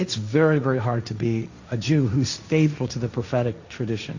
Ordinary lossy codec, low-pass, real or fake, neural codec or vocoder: Opus, 64 kbps; 7.2 kHz; fake; codec, 16 kHz, 1.1 kbps, Voila-Tokenizer